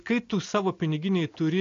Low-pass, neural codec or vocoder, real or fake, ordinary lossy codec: 7.2 kHz; none; real; AAC, 48 kbps